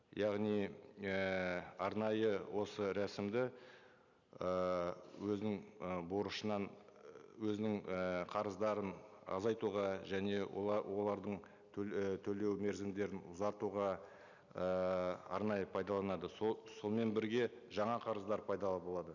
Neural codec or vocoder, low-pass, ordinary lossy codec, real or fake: none; 7.2 kHz; none; real